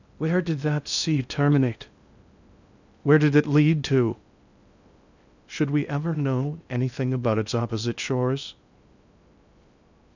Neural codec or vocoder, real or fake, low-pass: codec, 16 kHz in and 24 kHz out, 0.6 kbps, FocalCodec, streaming, 2048 codes; fake; 7.2 kHz